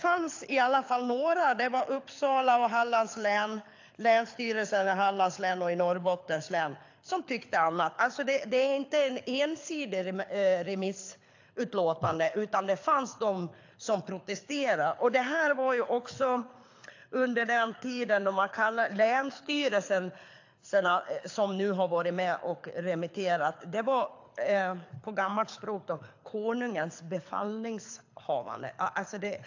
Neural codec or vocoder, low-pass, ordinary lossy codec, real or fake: codec, 24 kHz, 6 kbps, HILCodec; 7.2 kHz; AAC, 48 kbps; fake